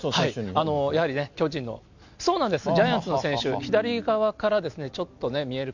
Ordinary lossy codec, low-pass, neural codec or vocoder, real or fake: none; 7.2 kHz; none; real